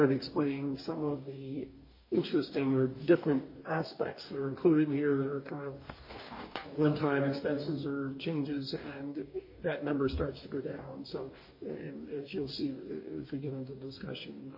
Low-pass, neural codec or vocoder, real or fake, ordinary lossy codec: 5.4 kHz; codec, 44.1 kHz, 2.6 kbps, DAC; fake; MP3, 24 kbps